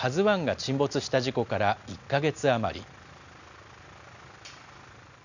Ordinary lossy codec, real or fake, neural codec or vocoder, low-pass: none; real; none; 7.2 kHz